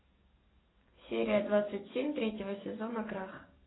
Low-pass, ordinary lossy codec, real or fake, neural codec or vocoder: 7.2 kHz; AAC, 16 kbps; fake; codec, 44.1 kHz, 7.8 kbps, DAC